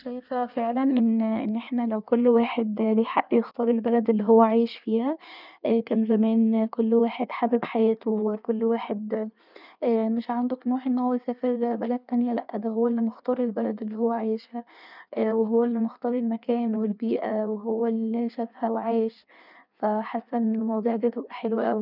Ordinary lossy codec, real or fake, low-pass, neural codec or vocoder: none; fake; 5.4 kHz; codec, 16 kHz in and 24 kHz out, 1.1 kbps, FireRedTTS-2 codec